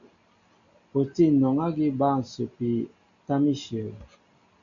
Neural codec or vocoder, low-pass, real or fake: none; 7.2 kHz; real